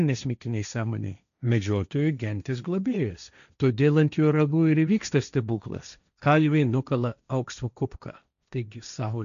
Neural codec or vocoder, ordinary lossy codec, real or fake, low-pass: codec, 16 kHz, 1.1 kbps, Voila-Tokenizer; MP3, 96 kbps; fake; 7.2 kHz